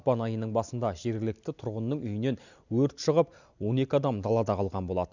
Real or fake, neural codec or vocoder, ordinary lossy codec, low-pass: real; none; none; 7.2 kHz